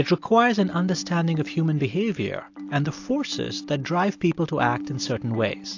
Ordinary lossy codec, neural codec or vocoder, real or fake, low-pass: AAC, 48 kbps; none; real; 7.2 kHz